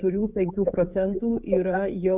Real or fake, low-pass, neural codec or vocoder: fake; 3.6 kHz; codec, 16 kHz, 16 kbps, FreqCodec, smaller model